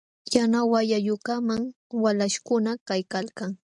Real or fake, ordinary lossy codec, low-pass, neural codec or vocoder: real; MP3, 96 kbps; 10.8 kHz; none